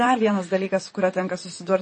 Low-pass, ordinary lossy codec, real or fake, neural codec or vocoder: 10.8 kHz; MP3, 32 kbps; fake; vocoder, 44.1 kHz, 128 mel bands, Pupu-Vocoder